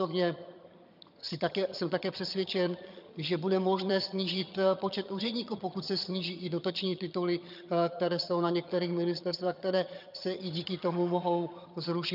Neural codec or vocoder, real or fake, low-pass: vocoder, 22.05 kHz, 80 mel bands, HiFi-GAN; fake; 5.4 kHz